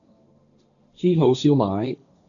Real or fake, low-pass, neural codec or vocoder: fake; 7.2 kHz; codec, 16 kHz, 1.1 kbps, Voila-Tokenizer